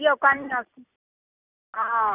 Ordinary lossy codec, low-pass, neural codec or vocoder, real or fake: none; 3.6 kHz; none; real